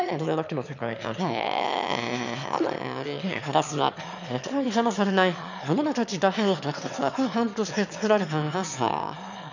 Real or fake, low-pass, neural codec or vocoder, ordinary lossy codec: fake; 7.2 kHz; autoencoder, 22.05 kHz, a latent of 192 numbers a frame, VITS, trained on one speaker; none